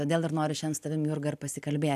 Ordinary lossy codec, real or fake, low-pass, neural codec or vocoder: AAC, 96 kbps; real; 14.4 kHz; none